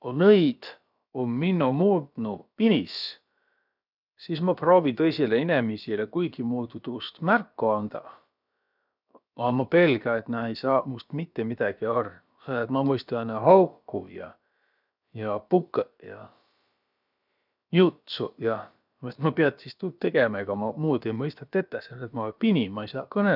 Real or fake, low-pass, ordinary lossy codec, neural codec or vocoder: fake; 5.4 kHz; none; codec, 16 kHz, about 1 kbps, DyCAST, with the encoder's durations